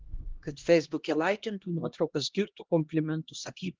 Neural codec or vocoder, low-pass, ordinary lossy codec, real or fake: codec, 16 kHz, 1 kbps, X-Codec, HuBERT features, trained on LibriSpeech; 7.2 kHz; Opus, 16 kbps; fake